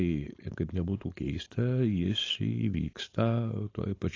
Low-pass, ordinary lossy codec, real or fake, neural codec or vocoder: 7.2 kHz; AAC, 32 kbps; fake; codec, 16 kHz, 4.8 kbps, FACodec